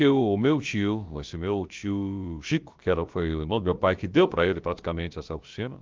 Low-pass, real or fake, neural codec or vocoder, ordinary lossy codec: 7.2 kHz; fake; codec, 16 kHz, about 1 kbps, DyCAST, with the encoder's durations; Opus, 32 kbps